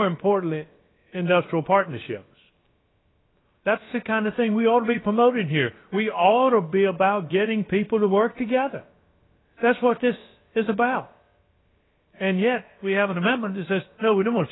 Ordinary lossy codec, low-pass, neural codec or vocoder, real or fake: AAC, 16 kbps; 7.2 kHz; codec, 16 kHz, about 1 kbps, DyCAST, with the encoder's durations; fake